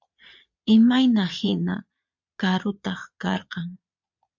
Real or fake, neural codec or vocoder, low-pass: fake; vocoder, 22.05 kHz, 80 mel bands, Vocos; 7.2 kHz